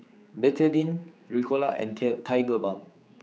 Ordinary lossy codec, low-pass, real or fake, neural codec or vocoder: none; none; fake; codec, 16 kHz, 4 kbps, X-Codec, HuBERT features, trained on general audio